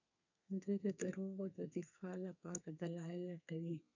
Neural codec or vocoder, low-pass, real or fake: codec, 32 kHz, 1.9 kbps, SNAC; 7.2 kHz; fake